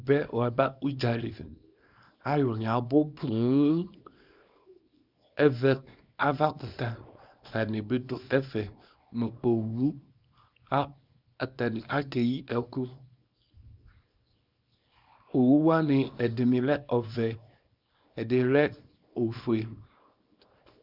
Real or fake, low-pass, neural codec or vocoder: fake; 5.4 kHz; codec, 24 kHz, 0.9 kbps, WavTokenizer, small release